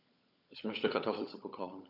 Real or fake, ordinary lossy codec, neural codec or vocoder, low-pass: fake; none; codec, 16 kHz, 16 kbps, FunCodec, trained on LibriTTS, 50 frames a second; 5.4 kHz